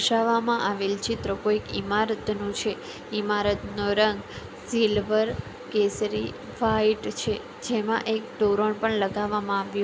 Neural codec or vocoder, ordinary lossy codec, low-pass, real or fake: none; none; none; real